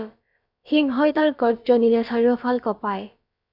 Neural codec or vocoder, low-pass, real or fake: codec, 16 kHz, about 1 kbps, DyCAST, with the encoder's durations; 5.4 kHz; fake